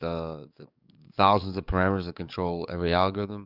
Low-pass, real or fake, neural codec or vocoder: 5.4 kHz; fake; codec, 44.1 kHz, 7.8 kbps, DAC